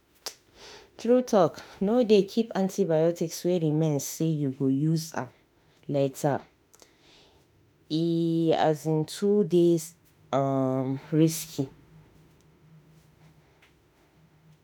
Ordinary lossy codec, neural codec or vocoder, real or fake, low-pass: none; autoencoder, 48 kHz, 32 numbers a frame, DAC-VAE, trained on Japanese speech; fake; none